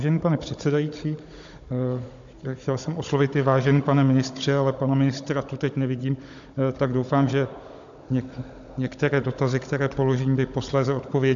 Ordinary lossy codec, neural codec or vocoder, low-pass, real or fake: AAC, 64 kbps; codec, 16 kHz, 16 kbps, FunCodec, trained on Chinese and English, 50 frames a second; 7.2 kHz; fake